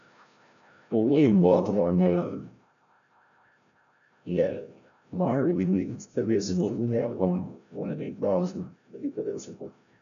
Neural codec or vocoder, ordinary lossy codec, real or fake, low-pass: codec, 16 kHz, 0.5 kbps, FreqCodec, larger model; none; fake; 7.2 kHz